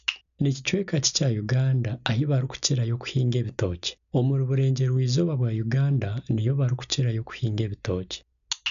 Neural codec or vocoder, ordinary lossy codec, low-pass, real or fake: none; none; 7.2 kHz; real